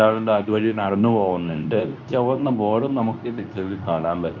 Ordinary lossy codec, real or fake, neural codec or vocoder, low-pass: none; fake; codec, 24 kHz, 0.9 kbps, WavTokenizer, medium speech release version 2; 7.2 kHz